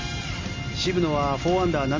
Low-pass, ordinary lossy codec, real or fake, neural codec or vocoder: 7.2 kHz; MP3, 48 kbps; real; none